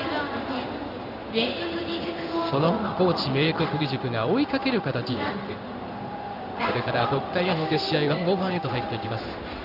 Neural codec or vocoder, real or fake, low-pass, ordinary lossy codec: codec, 16 kHz in and 24 kHz out, 1 kbps, XY-Tokenizer; fake; 5.4 kHz; none